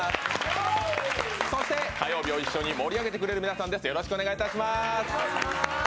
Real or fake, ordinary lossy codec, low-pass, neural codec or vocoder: real; none; none; none